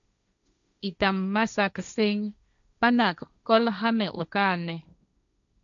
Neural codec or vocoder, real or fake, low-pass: codec, 16 kHz, 1.1 kbps, Voila-Tokenizer; fake; 7.2 kHz